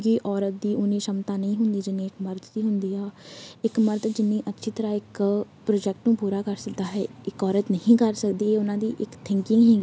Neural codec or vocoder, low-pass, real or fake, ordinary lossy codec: none; none; real; none